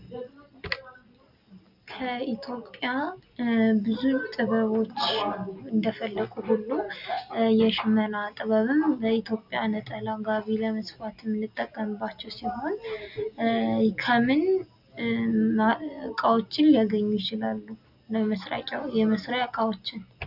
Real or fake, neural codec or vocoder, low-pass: real; none; 5.4 kHz